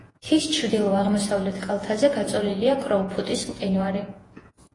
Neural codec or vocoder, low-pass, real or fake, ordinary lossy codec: vocoder, 48 kHz, 128 mel bands, Vocos; 10.8 kHz; fake; AAC, 32 kbps